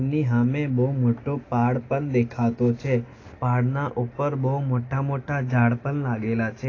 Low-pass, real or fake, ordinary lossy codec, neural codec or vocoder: 7.2 kHz; real; AAC, 32 kbps; none